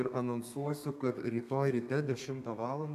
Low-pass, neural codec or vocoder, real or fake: 14.4 kHz; codec, 32 kHz, 1.9 kbps, SNAC; fake